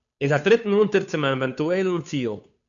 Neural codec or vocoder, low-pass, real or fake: codec, 16 kHz, 2 kbps, FunCodec, trained on Chinese and English, 25 frames a second; 7.2 kHz; fake